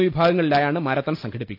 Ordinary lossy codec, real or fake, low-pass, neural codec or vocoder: none; real; 5.4 kHz; none